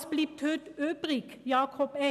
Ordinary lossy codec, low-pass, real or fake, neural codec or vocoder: none; 14.4 kHz; real; none